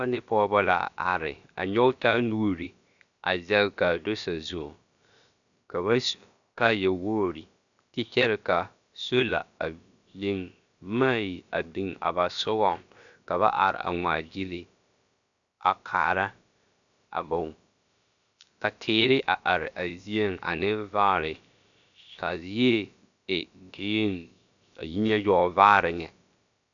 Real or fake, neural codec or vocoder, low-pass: fake; codec, 16 kHz, about 1 kbps, DyCAST, with the encoder's durations; 7.2 kHz